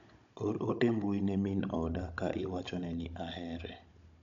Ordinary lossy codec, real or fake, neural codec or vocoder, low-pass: MP3, 64 kbps; fake; codec, 16 kHz, 16 kbps, FunCodec, trained on Chinese and English, 50 frames a second; 7.2 kHz